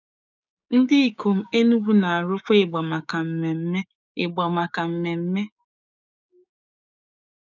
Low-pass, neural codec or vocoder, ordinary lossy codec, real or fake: 7.2 kHz; codec, 16 kHz, 6 kbps, DAC; none; fake